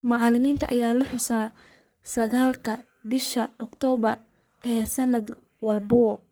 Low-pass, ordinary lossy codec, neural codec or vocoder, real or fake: none; none; codec, 44.1 kHz, 1.7 kbps, Pupu-Codec; fake